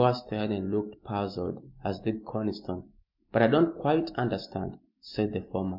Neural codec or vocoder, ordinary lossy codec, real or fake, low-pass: none; MP3, 48 kbps; real; 5.4 kHz